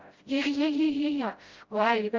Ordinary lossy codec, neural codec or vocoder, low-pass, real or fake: Opus, 32 kbps; codec, 16 kHz, 0.5 kbps, FreqCodec, smaller model; 7.2 kHz; fake